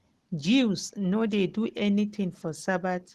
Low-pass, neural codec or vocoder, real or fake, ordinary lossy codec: 14.4 kHz; none; real; Opus, 16 kbps